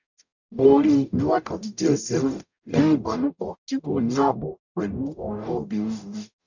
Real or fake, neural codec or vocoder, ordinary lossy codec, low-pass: fake; codec, 44.1 kHz, 0.9 kbps, DAC; none; 7.2 kHz